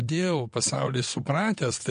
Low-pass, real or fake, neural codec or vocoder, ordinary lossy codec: 9.9 kHz; fake; vocoder, 22.05 kHz, 80 mel bands, WaveNeXt; MP3, 48 kbps